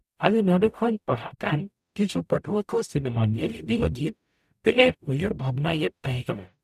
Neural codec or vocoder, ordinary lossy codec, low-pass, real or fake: codec, 44.1 kHz, 0.9 kbps, DAC; none; 14.4 kHz; fake